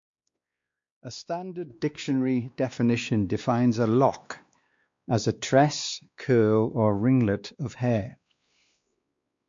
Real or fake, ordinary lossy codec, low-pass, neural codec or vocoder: fake; MP3, 48 kbps; 7.2 kHz; codec, 16 kHz, 2 kbps, X-Codec, WavLM features, trained on Multilingual LibriSpeech